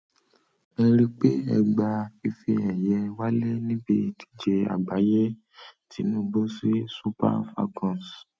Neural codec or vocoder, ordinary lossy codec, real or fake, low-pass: none; none; real; none